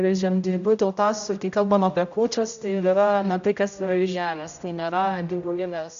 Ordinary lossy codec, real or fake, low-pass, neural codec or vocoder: MP3, 64 kbps; fake; 7.2 kHz; codec, 16 kHz, 0.5 kbps, X-Codec, HuBERT features, trained on general audio